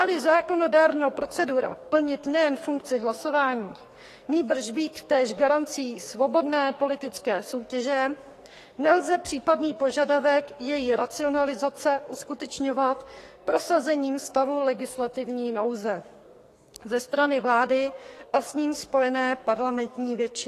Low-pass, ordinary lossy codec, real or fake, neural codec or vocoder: 14.4 kHz; AAC, 48 kbps; fake; codec, 44.1 kHz, 2.6 kbps, SNAC